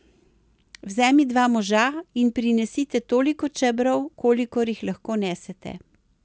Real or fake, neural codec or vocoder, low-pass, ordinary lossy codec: real; none; none; none